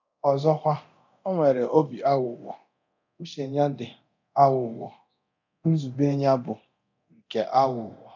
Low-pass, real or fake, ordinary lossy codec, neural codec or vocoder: 7.2 kHz; fake; none; codec, 24 kHz, 0.9 kbps, DualCodec